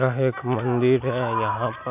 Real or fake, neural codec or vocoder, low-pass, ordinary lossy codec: real; none; 3.6 kHz; none